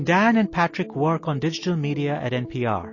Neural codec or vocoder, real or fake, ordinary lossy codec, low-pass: none; real; MP3, 32 kbps; 7.2 kHz